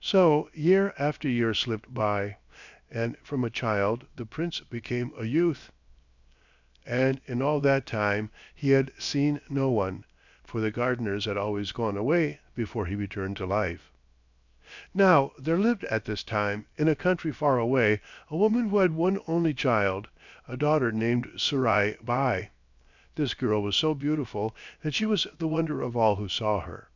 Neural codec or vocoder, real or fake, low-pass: codec, 16 kHz, 0.7 kbps, FocalCodec; fake; 7.2 kHz